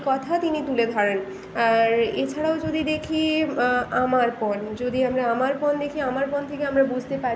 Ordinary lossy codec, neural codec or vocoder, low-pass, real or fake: none; none; none; real